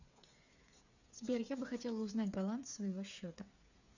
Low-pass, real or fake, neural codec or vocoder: 7.2 kHz; fake; codec, 16 kHz, 8 kbps, FreqCodec, smaller model